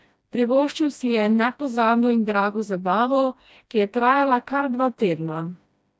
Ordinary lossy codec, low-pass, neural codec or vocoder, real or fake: none; none; codec, 16 kHz, 1 kbps, FreqCodec, smaller model; fake